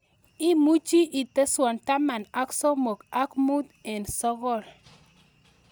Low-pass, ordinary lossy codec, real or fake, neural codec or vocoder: none; none; real; none